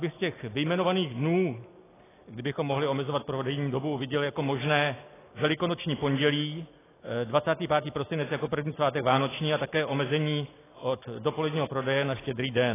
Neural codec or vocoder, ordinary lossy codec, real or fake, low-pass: none; AAC, 16 kbps; real; 3.6 kHz